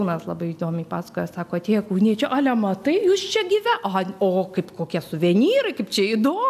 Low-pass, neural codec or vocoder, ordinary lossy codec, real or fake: 14.4 kHz; none; AAC, 96 kbps; real